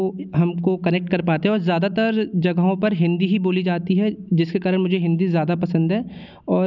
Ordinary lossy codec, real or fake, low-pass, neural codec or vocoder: none; real; 7.2 kHz; none